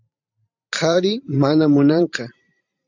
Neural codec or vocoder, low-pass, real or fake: vocoder, 44.1 kHz, 128 mel bands every 512 samples, BigVGAN v2; 7.2 kHz; fake